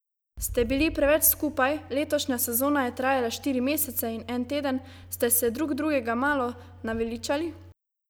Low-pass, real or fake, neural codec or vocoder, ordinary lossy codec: none; real; none; none